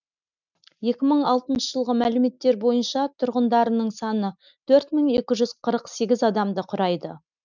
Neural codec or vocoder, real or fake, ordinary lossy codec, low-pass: none; real; none; 7.2 kHz